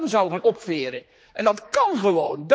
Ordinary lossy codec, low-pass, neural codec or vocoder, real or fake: none; none; codec, 16 kHz, 2 kbps, X-Codec, HuBERT features, trained on general audio; fake